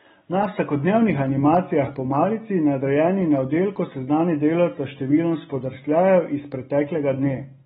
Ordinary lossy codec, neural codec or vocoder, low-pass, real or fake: AAC, 16 kbps; none; 7.2 kHz; real